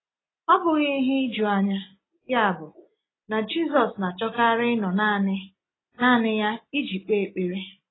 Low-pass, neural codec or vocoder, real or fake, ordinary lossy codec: 7.2 kHz; none; real; AAC, 16 kbps